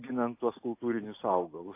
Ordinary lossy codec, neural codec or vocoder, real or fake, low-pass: AAC, 24 kbps; none; real; 3.6 kHz